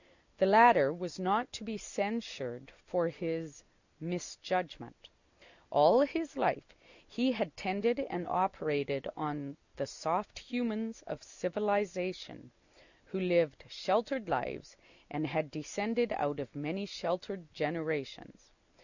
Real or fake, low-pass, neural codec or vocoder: real; 7.2 kHz; none